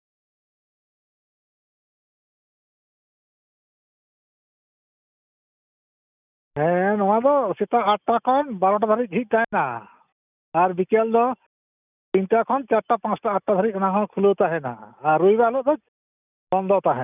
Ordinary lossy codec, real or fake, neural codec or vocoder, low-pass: none; real; none; 3.6 kHz